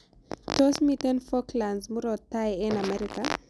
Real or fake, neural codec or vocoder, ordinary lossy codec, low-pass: real; none; none; none